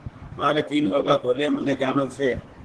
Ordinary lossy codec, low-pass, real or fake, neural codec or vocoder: Opus, 16 kbps; 10.8 kHz; fake; codec, 24 kHz, 1 kbps, SNAC